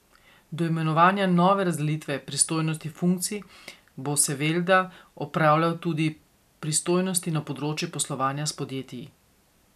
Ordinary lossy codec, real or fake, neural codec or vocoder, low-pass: none; real; none; 14.4 kHz